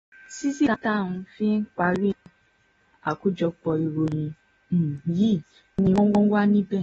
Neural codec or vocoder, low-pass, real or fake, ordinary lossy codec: none; 10.8 kHz; real; AAC, 24 kbps